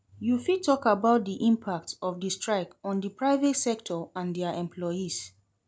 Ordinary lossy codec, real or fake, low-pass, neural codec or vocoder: none; real; none; none